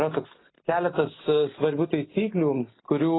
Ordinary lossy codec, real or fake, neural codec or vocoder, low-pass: AAC, 16 kbps; real; none; 7.2 kHz